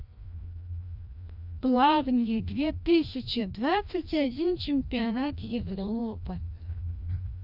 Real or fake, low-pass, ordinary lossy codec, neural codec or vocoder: fake; 5.4 kHz; none; codec, 16 kHz, 1 kbps, FreqCodec, larger model